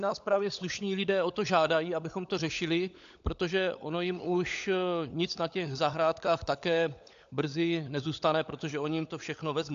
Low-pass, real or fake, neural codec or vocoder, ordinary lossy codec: 7.2 kHz; fake; codec, 16 kHz, 16 kbps, FunCodec, trained on LibriTTS, 50 frames a second; AAC, 64 kbps